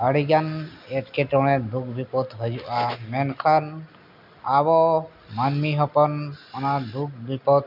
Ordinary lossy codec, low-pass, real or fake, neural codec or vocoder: none; 5.4 kHz; real; none